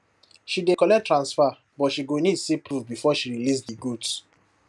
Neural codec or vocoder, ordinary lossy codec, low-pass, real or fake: none; none; none; real